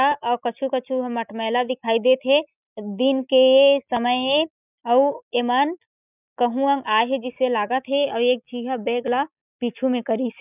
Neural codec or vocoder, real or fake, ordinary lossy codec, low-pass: none; real; none; 3.6 kHz